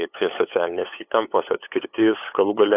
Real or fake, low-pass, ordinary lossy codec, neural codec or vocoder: fake; 3.6 kHz; AAC, 24 kbps; codec, 16 kHz, 8 kbps, FunCodec, trained on LibriTTS, 25 frames a second